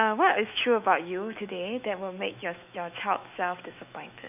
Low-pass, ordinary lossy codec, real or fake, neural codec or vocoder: 3.6 kHz; none; fake; autoencoder, 48 kHz, 128 numbers a frame, DAC-VAE, trained on Japanese speech